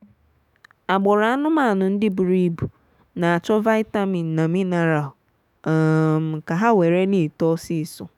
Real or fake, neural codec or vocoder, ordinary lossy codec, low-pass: fake; autoencoder, 48 kHz, 128 numbers a frame, DAC-VAE, trained on Japanese speech; none; 19.8 kHz